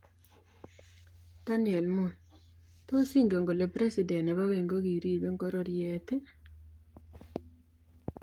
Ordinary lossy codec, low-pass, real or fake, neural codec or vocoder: Opus, 32 kbps; 19.8 kHz; fake; codec, 44.1 kHz, 7.8 kbps, Pupu-Codec